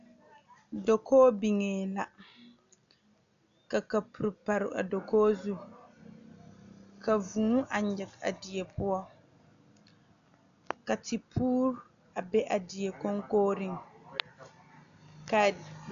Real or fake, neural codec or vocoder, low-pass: real; none; 7.2 kHz